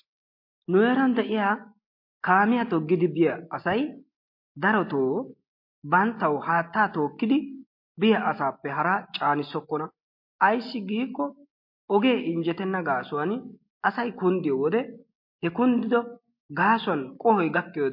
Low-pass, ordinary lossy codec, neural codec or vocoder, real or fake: 5.4 kHz; MP3, 32 kbps; none; real